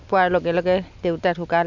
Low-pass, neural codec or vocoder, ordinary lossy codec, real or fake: 7.2 kHz; none; none; real